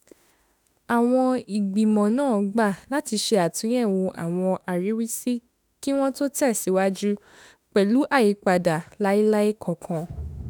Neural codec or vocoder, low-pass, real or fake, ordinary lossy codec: autoencoder, 48 kHz, 32 numbers a frame, DAC-VAE, trained on Japanese speech; none; fake; none